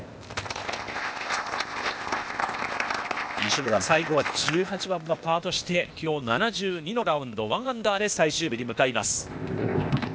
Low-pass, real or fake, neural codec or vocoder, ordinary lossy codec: none; fake; codec, 16 kHz, 0.8 kbps, ZipCodec; none